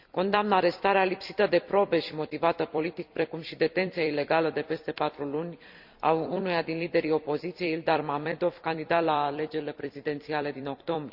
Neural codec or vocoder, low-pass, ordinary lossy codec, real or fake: vocoder, 44.1 kHz, 128 mel bands every 256 samples, BigVGAN v2; 5.4 kHz; Opus, 64 kbps; fake